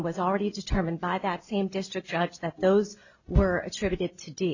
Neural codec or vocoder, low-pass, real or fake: none; 7.2 kHz; real